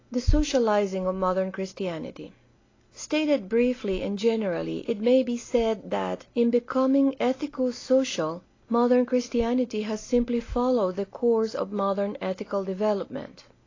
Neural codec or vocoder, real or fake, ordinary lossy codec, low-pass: none; real; AAC, 32 kbps; 7.2 kHz